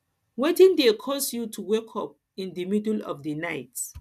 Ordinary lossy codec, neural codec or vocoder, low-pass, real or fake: none; none; 14.4 kHz; real